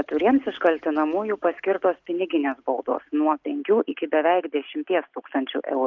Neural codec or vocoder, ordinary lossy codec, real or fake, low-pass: none; Opus, 32 kbps; real; 7.2 kHz